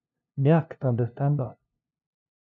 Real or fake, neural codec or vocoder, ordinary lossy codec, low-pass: fake; codec, 16 kHz, 0.5 kbps, FunCodec, trained on LibriTTS, 25 frames a second; MP3, 64 kbps; 7.2 kHz